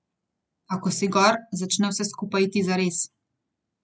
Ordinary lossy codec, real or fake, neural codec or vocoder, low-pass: none; real; none; none